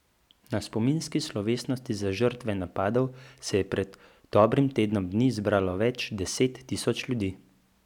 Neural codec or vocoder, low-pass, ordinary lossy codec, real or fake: none; 19.8 kHz; none; real